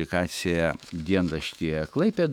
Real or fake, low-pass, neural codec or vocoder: fake; 19.8 kHz; autoencoder, 48 kHz, 128 numbers a frame, DAC-VAE, trained on Japanese speech